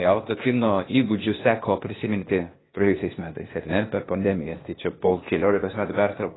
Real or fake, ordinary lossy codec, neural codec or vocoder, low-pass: fake; AAC, 16 kbps; codec, 16 kHz, 0.8 kbps, ZipCodec; 7.2 kHz